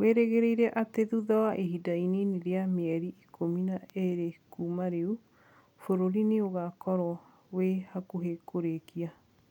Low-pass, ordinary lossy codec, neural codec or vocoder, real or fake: 19.8 kHz; none; none; real